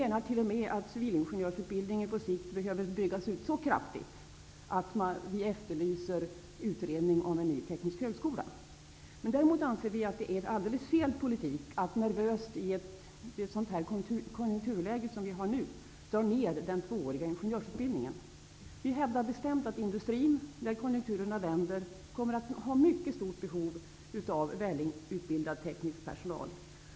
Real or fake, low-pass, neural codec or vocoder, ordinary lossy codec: real; none; none; none